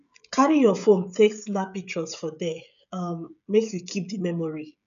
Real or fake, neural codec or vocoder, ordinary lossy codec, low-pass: fake; codec, 16 kHz, 16 kbps, FreqCodec, smaller model; none; 7.2 kHz